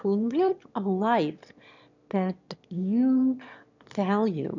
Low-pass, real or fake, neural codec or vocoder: 7.2 kHz; fake; autoencoder, 22.05 kHz, a latent of 192 numbers a frame, VITS, trained on one speaker